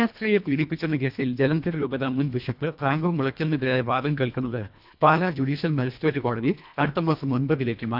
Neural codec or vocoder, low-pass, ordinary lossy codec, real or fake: codec, 24 kHz, 1.5 kbps, HILCodec; 5.4 kHz; none; fake